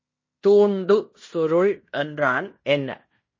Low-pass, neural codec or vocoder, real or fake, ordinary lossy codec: 7.2 kHz; codec, 16 kHz in and 24 kHz out, 0.9 kbps, LongCat-Audio-Codec, fine tuned four codebook decoder; fake; MP3, 32 kbps